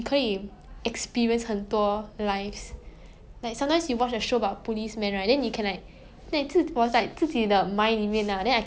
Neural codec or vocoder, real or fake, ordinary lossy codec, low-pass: none; real; none; none